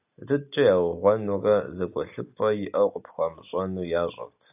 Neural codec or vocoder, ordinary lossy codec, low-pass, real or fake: none; AAC, 32 kbps; 3.6 kHz; real